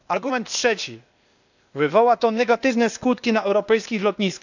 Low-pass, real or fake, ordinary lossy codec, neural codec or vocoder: 7.2 kHz; fake; none; codec, 16 kHz, 0.8 kbps, ZipCodec